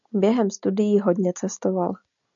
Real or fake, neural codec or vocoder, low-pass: real; none; 7.2 kHz